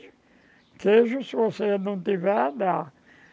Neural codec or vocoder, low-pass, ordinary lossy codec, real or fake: none; none; none; real